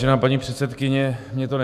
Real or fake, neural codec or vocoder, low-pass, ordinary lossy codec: fake; autoencoder, 48 kHz, 128 numbers a frame, DAC-VAE, trained on Japanese speech; 14.4 kHz; AAC, 96 kbps